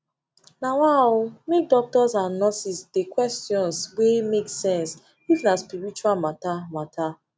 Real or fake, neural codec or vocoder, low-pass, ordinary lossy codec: real; none; none; none